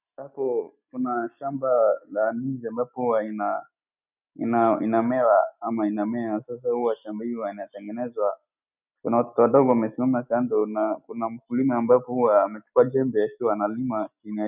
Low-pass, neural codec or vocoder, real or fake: 3.6 kHz; none; real